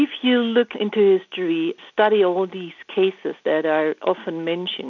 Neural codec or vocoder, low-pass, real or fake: none; 7.2 kHz; real